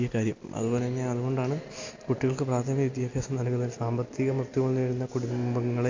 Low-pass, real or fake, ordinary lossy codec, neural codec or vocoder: 7.2 kHz; real; AAC, 48 kbps; none